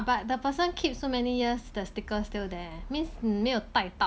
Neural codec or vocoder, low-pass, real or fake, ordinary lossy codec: none; none; real; none